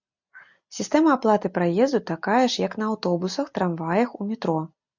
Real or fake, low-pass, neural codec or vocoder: real; 7.2 kHz; none